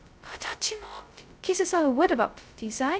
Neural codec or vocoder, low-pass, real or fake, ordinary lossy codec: codec, 16 kHz, 0.2 kbps, FocalCodec; none; fake; none